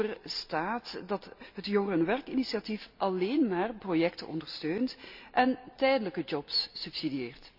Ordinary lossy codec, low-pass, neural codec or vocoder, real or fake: AAC, 48 kbps; 5.4 kHz; none; real